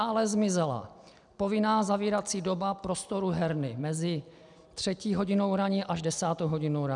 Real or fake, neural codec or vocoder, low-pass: real; none; 10.8 kHz